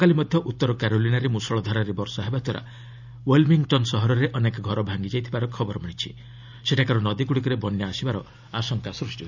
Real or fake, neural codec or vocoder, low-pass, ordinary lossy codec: real; none; 7.2 kHz; none